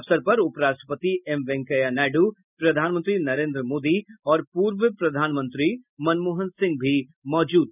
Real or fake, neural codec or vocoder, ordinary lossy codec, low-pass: real; none; none; 3.6 kHz